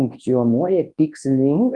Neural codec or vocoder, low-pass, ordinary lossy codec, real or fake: codec, 24 kHz, 0.9 kbps, WavTokenizer, large speech release; 10.8 kHz; Opus, 24 kbps; fake